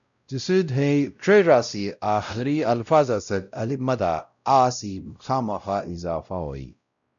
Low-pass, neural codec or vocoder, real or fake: 7.2 kHz; codec, 16 kHz, 0.5 kbps, X-Codec, WavLM features, trained on Multilingual LibriSpeech; fake